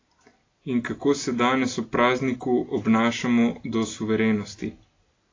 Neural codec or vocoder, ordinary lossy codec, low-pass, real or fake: none; AAC, 32 kbps; 7.2 kHz; real